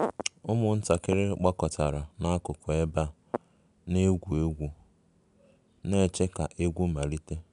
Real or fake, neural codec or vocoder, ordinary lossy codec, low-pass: real; none; none; 10.8 kHz